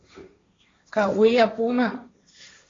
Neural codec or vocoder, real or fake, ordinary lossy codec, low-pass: codec, 16 kHz, 1.1 kbps, Voila-Tokenizer; fake; AAC, 32 kbps; 7.2 kHz